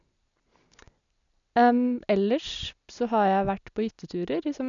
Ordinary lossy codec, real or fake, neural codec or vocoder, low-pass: none; real; none; 7.2 kHz